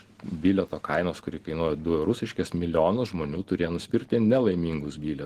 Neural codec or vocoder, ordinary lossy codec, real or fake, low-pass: none; Opus, 16 kbps; real; 14.4 kHz